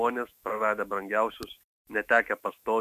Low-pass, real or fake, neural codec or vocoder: 14.4 kHz; fake; autoencoder, 48 kHz, 128 numbers a frame, DAC-VAE, trained on Japanese speech